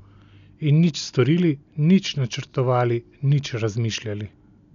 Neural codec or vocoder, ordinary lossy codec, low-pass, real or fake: none; none; 7.2 kHz; real